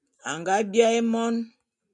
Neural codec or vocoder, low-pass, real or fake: none; 10.8 kHz; real